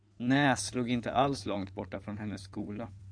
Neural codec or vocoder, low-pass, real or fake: codec, 16 kHz in and 24 kHz out, 2.2 kbps, FireRedTTS-2 codec; 9.9 kHz; fake